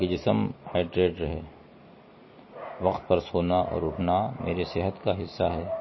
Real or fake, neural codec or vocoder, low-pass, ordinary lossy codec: real; none; 7.2 kHz; MP3, 24 kbps